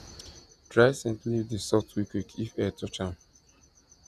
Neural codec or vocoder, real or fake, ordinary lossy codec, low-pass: vocoder, 48 kHz, 128 mel bands, Vocos; fake; none; 14.4 kHz